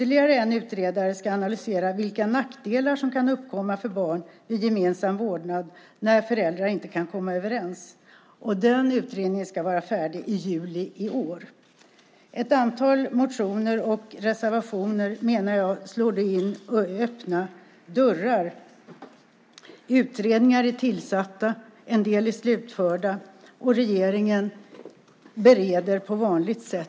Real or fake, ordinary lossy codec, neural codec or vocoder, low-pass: real; none; none; none